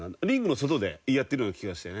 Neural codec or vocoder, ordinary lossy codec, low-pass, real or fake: none; none; none; real